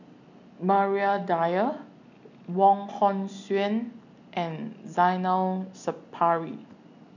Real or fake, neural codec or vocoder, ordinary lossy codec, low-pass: real; none; none; 7.2 kHz